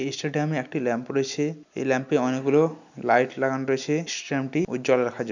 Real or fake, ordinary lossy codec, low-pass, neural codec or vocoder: real; none; 7.2 kHz; none